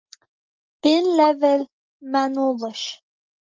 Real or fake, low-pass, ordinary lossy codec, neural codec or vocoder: real; 7.2 kHz; Opus, 24 kbps; none